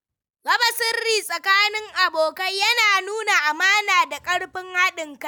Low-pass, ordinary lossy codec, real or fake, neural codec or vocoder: none; none; real; none